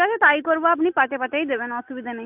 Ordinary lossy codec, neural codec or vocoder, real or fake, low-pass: AAC, 32 kbps; none; real; 3.6 kHz